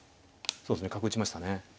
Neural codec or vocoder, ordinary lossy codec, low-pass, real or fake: none; none; none; real